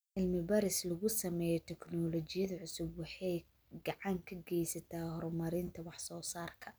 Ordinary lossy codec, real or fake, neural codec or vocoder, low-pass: none; real; none; none